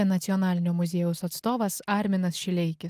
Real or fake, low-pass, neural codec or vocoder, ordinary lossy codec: real; 14.4 kHz; none; Opus, 24 kbps